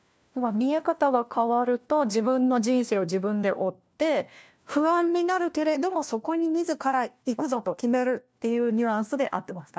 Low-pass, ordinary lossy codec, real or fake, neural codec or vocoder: none; none; fake; codec, 16 kHz, 1 kbps, FunCodec, trained on LibriTTS, 50 frames a second